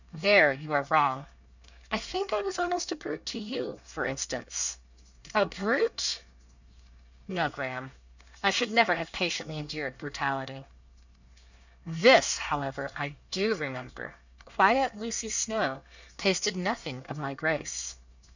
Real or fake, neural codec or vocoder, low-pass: fake; codec, 24 kHz, 1 kbps, SNAC; 7.2 kHz